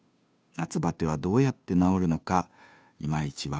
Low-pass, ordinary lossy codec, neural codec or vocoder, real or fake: none; none; codec, 16 kHz, 2 kbps, FunCodec, trained on Chinese and English, 25 frames a second; fake